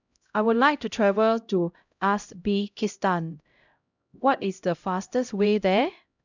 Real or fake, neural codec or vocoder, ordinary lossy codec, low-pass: fake; codec, 16 kHz, 0.5 kbps, X-Codec, HuBERT features, trained on LibriSpeech; none; 7.2 kHz